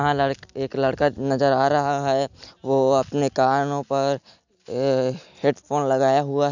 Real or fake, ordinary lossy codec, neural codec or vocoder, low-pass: real; none; none; 7.2 kHz